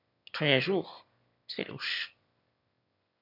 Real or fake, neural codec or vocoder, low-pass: fake; autoencoder, 22.05 kHz, a latent of 192 numbers a frame, VITS, trained on one speaker; 5.4 kHz